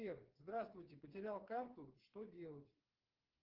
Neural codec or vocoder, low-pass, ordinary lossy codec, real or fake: codec, 16 kHz, 4 kbps, FreqCodec, smaller model; 5.4 kHz; Opus, 16 kbps; fake